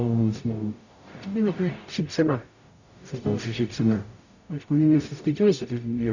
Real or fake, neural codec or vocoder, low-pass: fake; codec, 44.1 kHz, 0.9 kbps, DAC; 7.2 kHz